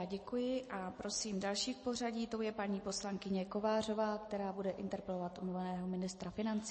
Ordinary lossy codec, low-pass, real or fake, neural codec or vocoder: MP3, 32 kbps; 10.8 kHz; real; none